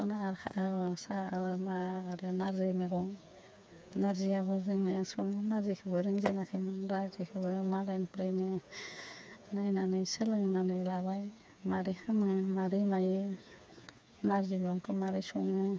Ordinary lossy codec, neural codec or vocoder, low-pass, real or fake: none; codec, 16 kHz, 4 kbps, FreqCodec, smaller model; none; fake